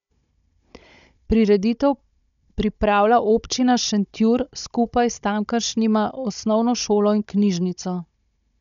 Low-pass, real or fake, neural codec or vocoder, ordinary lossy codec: 7.2 kHz; fake; codec, 16 kHz, 16 kbps, FunCodec, trained on Chinese and English, 50 frames a second; none